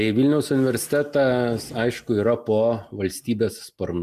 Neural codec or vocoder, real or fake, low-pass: none; real; 14.4 kHz